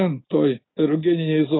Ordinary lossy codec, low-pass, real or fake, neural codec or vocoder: AAC, 16 kbps; 7.2 kHz; real; none